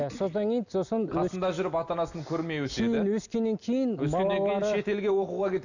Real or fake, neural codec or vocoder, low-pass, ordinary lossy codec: real; none; 7.2 kHz; none